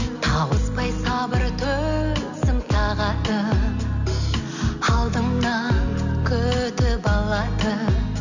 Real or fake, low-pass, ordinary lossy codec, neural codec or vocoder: real; 7.2 kHz; none; none